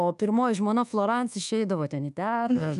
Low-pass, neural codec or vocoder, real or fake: 10.8 kHz; codec, 24 kHz, 1.2 kbps, DualCodec; fake